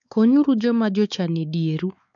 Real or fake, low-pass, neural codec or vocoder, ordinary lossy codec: fake; 7.2 kHz; codec, 16 kHz, 4 kbps, X-Codec, WavLM features, trained on Multilingual LibriSpeech; MP3, 96 kbps